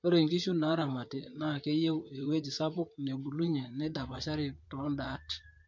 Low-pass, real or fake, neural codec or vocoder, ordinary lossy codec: 7.2 kHz; fake; vocoder, 44.1 kHz, 128 mel bands, Pupu-Vocoder; MP3, 48 kbps